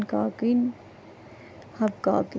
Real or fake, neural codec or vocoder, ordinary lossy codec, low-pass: real; none; none; none